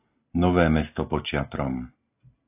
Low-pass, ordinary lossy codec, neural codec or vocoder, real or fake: 3.6 kHz; AAC, 24 kbps; none; real